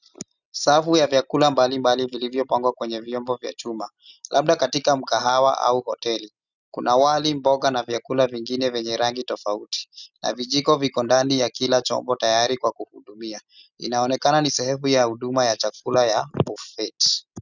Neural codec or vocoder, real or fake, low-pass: none; real; 7.2 kHz